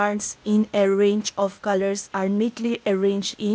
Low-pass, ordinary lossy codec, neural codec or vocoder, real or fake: none; none; codec, 16 kHz, 0.8 kbps, ZipCodec; fake